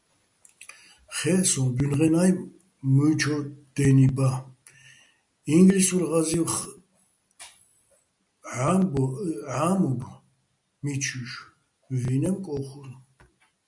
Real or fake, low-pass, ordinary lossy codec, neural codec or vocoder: real; 10.8 kHz; MP3, 96 kbps; none